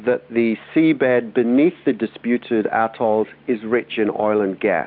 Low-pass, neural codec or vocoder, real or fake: 5.4 kHz; none; real